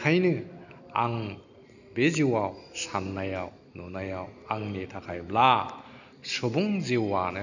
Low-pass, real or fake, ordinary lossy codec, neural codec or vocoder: 7.2 kHz; real; none; none